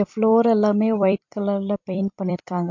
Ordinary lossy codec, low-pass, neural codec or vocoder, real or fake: MP3, 48 kbps; 7.2 kHz; vocoder, 44.1 kHz, 128 mel bands every 256 samples, BigVGAN v2; fake